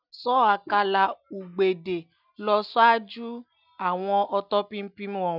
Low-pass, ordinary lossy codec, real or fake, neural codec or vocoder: 5.4 kHz; none; real; none